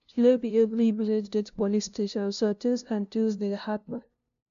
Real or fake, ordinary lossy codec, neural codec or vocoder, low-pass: fake; none; codec, 16 kHz, 0.5 kbps, FunCodec, trained on LibriTTS, 25 frames a second; 7.2 kHz